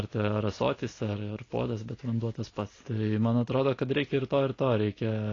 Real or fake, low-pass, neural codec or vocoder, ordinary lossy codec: real; 7.2 kHz; none; AAC, 32 kbps